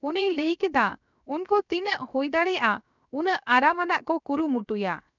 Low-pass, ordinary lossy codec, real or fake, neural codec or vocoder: 7.2 kHz; none; fake; codec, 16 kHz, 0.7 kbps, FocalCodec